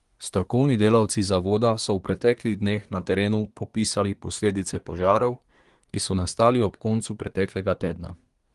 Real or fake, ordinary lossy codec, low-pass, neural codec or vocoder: fake; Opus, 24 kbps; 10.8 kHz; codec, 24 kHz, 1 kbps, SNAC